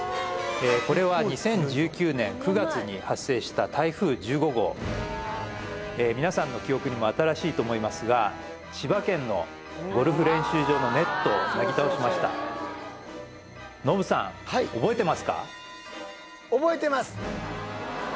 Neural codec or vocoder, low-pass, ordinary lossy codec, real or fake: none; none; none; real